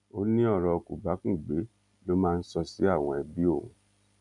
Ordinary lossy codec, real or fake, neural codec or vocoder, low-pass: none; real; none; 10.8 kHz